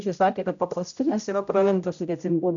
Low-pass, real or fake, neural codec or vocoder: 7.2 kHz; fake; codec, 16 kHz, 0.5 kbps, X-Codec, HuBERT features, trained on general audio